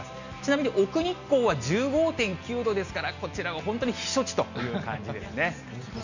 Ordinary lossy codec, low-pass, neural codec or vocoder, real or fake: none; 7.2 kHz; none; real